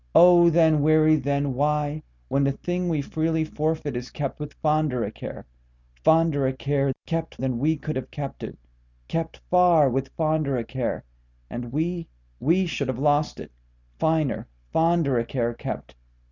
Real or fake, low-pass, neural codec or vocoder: real; 7.2 kHz; none